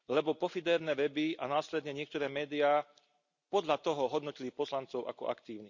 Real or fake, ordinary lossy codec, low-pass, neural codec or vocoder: real; none; 7.2 kHz; none